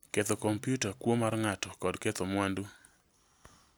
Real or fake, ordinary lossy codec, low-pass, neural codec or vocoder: real; none; none; none